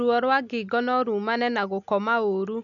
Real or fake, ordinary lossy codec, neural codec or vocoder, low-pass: real; none; none; 7.2 kHz